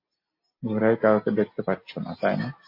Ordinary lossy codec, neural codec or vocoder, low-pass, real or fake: MP3, 32 kbps; none; 5.4 kHz; real